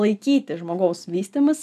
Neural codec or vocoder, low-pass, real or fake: none; 14.4 kHz; real